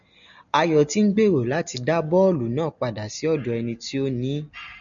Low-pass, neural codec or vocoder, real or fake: 7.2 kHz; none; real